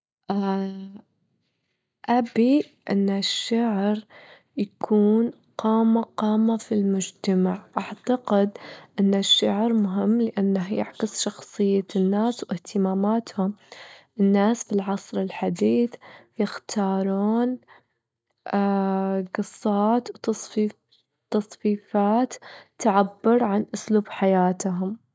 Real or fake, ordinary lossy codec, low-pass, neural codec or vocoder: real; none; none; none